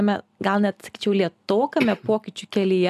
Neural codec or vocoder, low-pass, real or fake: none; 14.4 kHz; real